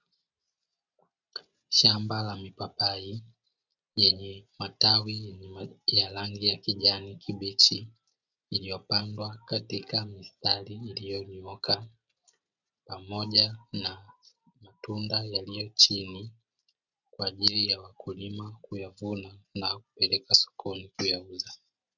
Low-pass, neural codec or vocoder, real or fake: 7.2 kHz; none; real